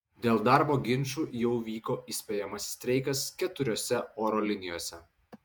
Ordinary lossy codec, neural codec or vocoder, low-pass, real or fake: MP3, 96 kbps; vocoder, 48 kHz, 128 mel bands, Vocos; 19.8 kHz; fake